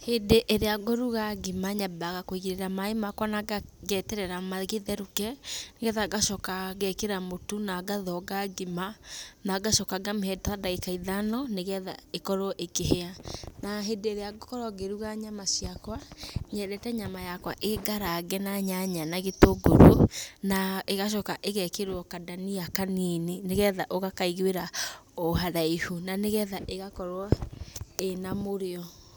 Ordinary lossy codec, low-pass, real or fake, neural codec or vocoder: none; none; real; none